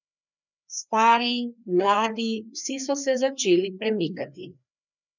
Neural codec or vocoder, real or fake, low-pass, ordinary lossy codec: codec, 16 kHz, 2 kbps, FreqCodec, larger model; fake; 7.2 kHz; none